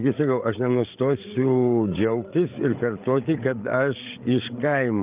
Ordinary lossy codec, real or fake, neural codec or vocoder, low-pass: Opus, 32 kbps; fake; codec, 16 kHz, 8 kbps, FreqCodec, larger model; 3.6 kHz